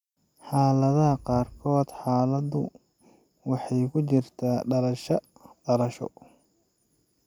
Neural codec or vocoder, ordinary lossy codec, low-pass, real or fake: none; none; 19.8 kHz; real